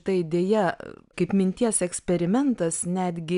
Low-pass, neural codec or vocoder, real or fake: 10.8 kHz; none; real